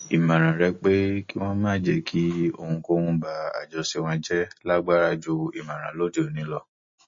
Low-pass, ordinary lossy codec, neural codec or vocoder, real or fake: 7.2 kHz; MP3, 32 kbps; none; real